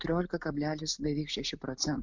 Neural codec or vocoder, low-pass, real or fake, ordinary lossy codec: none; 7.2 kHz; real; MP3, 64 kbps